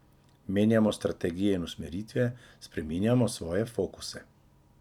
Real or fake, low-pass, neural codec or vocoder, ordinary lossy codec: fake; 19.8 kHz; vocoder, 44.1 kHz, 128 mel bands every 256 samples, BigVGAN v2; none